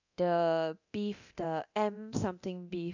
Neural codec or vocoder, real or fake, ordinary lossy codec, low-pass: codec, 24 kHz, 0.9 kbps, DualCodec; fake; none; 7.2 kHz